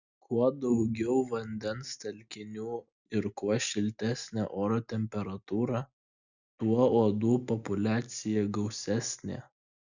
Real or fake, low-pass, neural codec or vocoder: real; 7.2 kHz; none